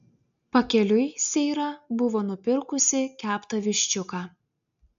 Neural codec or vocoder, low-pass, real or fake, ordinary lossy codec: none; 7.2 kHz; real; AAC, 96 kbps